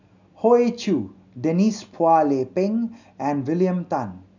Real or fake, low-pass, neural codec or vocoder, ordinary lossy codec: real; 7.2 kHz; none; none